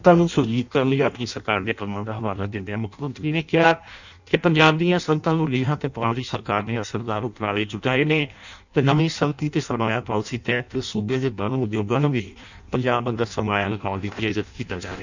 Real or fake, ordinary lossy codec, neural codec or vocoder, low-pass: fake; none; codec, 16 kHz in and 24 kHz out, 0.6 kbps, FireRedTTS-2 codec; 7.2 kHz